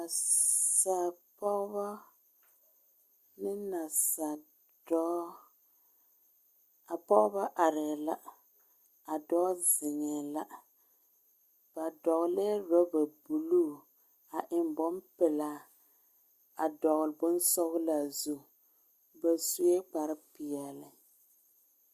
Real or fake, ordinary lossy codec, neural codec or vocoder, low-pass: real; Opus, 64 kbps; none; 14.4 kHz